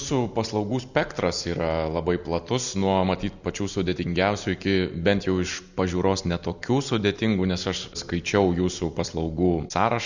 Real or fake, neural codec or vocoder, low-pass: real; none; 7.2 kHz